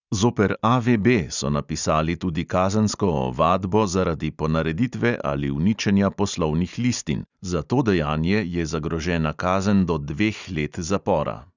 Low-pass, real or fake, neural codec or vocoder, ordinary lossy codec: 7.2 kHz; real; none; none